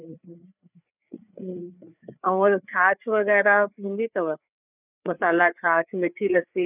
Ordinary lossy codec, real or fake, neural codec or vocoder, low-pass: none; fake; codec, 16 kHz, 4 kbps, FreqCodec, larger model; 3.6 kHz